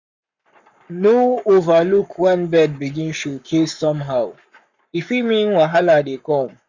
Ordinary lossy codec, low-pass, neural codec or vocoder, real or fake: none; 7.2 kHz; codec, 44.1 kHz, 7.8 kbps, Pupu-Codec; fake